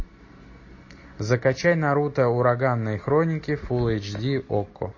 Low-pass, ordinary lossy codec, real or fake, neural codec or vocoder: 7.2 kHz; MP3, 32 kbps; real; none